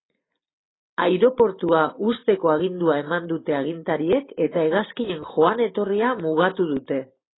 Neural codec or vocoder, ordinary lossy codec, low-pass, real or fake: none; AAC, 16 kbps; 7.2 kHz; real